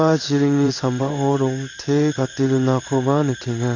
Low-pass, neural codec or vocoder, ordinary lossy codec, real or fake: 7.2 kHz; codec, 16 kHz in and 24 kHz out, 1 kbps, XY-Tokenizer; none; fake